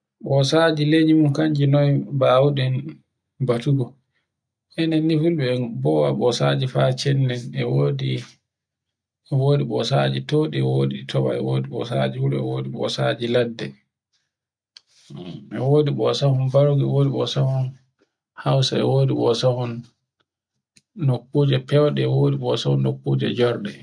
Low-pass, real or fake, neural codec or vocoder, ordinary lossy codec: 9.9 kHz; real; none; none